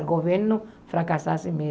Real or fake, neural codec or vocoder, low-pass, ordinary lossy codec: real; none; none; none